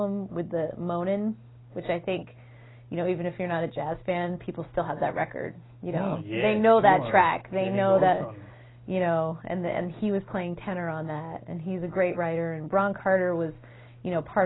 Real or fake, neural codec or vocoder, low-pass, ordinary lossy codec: real; none; 7.2 kHz; AAC, 16 kbps